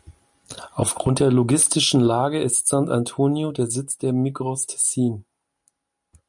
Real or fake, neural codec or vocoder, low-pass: real; none; 10.8 kHz